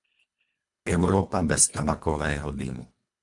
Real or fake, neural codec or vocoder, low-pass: fake; codec, 24 kHz, 1.5 kbps, HILCodec; 10.8 kHz